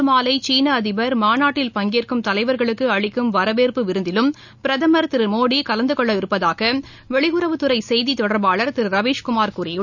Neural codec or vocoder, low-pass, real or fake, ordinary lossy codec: none; 7.2 kHz; real; none